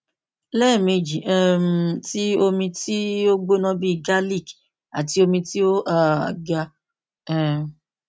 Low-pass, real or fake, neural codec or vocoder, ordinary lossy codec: none; real; none; none